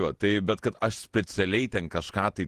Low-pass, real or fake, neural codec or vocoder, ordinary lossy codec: 14.4 kHz; fake; vocoder, 48 kHz, 128 mel bands, Vocos; Opus, 16 kbps